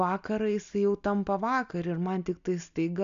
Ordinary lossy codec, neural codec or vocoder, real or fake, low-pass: AAC, 96 kbps; none; real; 7.2 kHz